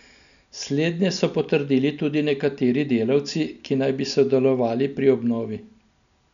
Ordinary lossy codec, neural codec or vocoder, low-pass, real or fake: none; none; 7.2 kHz; real